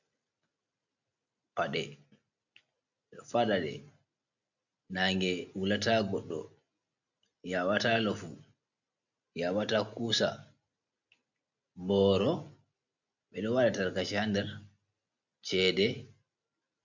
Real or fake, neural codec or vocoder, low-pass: real; none; 7.2 kHz